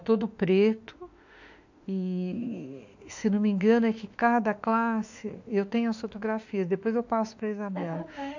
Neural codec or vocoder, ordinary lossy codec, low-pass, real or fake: autoencoder, 48 kHz, 32 numbers a frame, DAC-VAE, trained on Japanese speech; none; 7.2 kHz; fake